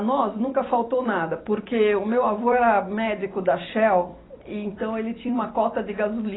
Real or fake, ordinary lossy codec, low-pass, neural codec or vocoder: fake; AAC, 16 kbps; 7.2 kHz; vocoder, 44.1 kHz, 128 mel bands every 256 samples, BigVGAN v2